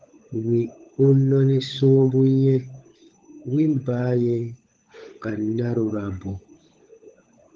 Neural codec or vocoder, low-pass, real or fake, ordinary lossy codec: codec, 16 kHz, 16 kbps, FunCodec, trained on LibriTTS, 50 frames a second; 7.2 kHz; fake; Opus, 16 kbps